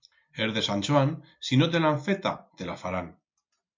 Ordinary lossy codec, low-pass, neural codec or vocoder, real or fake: MP3, 48 kbps; 7.2 kHz; none; real